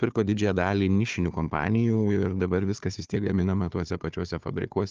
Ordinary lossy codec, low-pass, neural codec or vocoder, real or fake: Opus, 24 kbps; 7.2 kHz; codec, 16 kHz, 4 kbps, FunCodec, trained on Chinese and English, 50 frames a second; fake